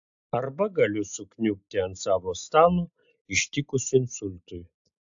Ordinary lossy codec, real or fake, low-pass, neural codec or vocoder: AAC, 64 kbps; real; 7.2 kHz; none